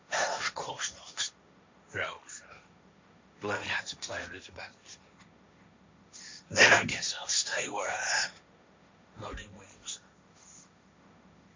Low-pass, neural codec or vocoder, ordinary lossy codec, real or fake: 7.2 kHz; codec, 16 kHz, 1.1 kbps, Voila-Tokenizer; MP3, 64 kbps; fake